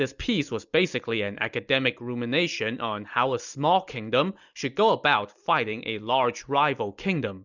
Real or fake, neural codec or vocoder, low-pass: real; none; 7.2 kHz